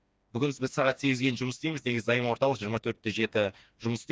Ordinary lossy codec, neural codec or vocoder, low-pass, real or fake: none; codec, 16 kHz, 2 kbps, FreqCodec, smaller model; none; fake